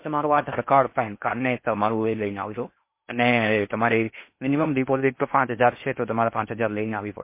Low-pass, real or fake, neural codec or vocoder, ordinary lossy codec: 3.6 kHz; fake; codec, 16 kHz in and 24 kHz out, 0.6 kbps, FocalCodec, streaming, 4096 codes; MP3, 24 kbps